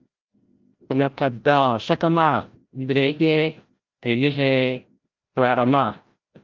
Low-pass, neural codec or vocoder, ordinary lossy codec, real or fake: 7.2 kHz; codec, 16 kHz, 0.5 kbps, FreqCodec, larger model; Opus, 24 kbps; fake